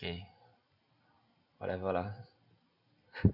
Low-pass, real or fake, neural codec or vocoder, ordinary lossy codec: 5.4 kHz; real; none; none